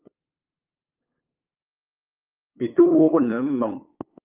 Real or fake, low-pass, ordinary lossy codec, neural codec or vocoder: fake; 3.6 kHz; Opus, 24 kbps; codec, 16 kHz, 8 kbps, FunCodec, trained on LibriTTS, 25 frames a second